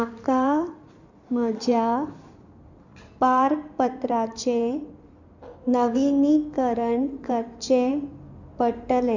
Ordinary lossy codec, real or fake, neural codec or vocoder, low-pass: none; fake; codec, 16 kHz in and 24 kHz out, 2.2 kbps, FireRedTTS-2 codec; 7.2 kHz